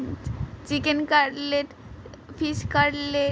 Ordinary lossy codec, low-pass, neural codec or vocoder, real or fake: none; none; none; real